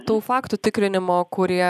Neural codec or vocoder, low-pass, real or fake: none; 14.4 kHz; real